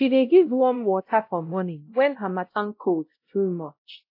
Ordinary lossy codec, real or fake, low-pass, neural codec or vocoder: AAC, 32 kbps; fake; 5.4 kHz; codec, 16 kHz, 0.5 kbps, X-Codec, WavLM features, trained on Multilingual LibriSpeech